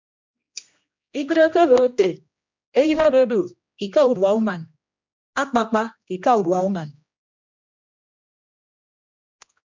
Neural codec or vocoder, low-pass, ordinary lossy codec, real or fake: codec, 16 kHz, 1 kbps, X-Codec, HuBERT features, trained on general audio; 7.2 kHz; MP3, 64 kbps; fake